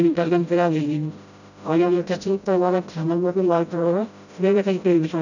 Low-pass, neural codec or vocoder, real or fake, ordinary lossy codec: 7.2 kHz; codec, 16 kHz, 0.5 kbps, FreqCodec, smaller model; fake; none